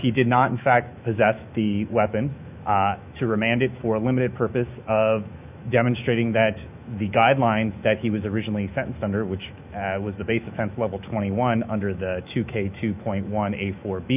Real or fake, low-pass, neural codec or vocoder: fake; 3.6 kHz; autoencoder, 48 kHz, 128 numbers a frame, DAC-VAE, trained on Japanese speech